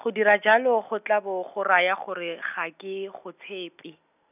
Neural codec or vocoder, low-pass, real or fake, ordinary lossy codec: none; 3.6 kHz; real; none